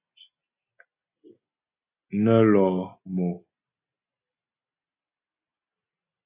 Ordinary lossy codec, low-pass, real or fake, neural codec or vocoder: AAC, 32 kbps; 3.6 kHz; real; none